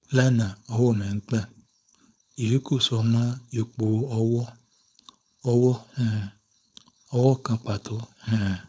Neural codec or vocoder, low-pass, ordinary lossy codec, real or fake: codec, 16 kHz, 4.8 kbps, FACodec; none; none; fake